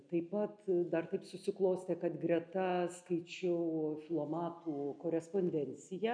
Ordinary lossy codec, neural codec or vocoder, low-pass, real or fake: MP3, 96 kbps; none; 9.9 kHz; real